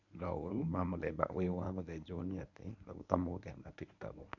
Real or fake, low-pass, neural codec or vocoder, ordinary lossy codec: fake; 7.2 kHz; codec, 24 kHz, 0.9 kbps, WavTokenizer, medium speech release version 1; none